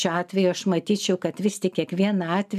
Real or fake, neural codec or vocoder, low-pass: real; none; 14.4 kHz